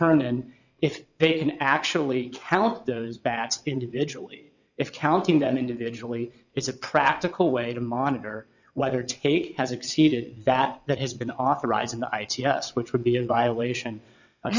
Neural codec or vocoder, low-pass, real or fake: vocoder, 22.05 kHz, 80 mel bands, WaveNeXt; 7.2 kHz; fake